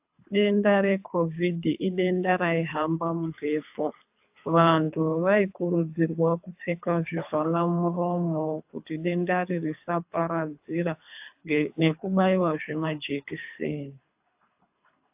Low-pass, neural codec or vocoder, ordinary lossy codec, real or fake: 3.6 kHz; codec, 24 kHz, 3 kbps, HILCodec; AAC, 32 kbps; fake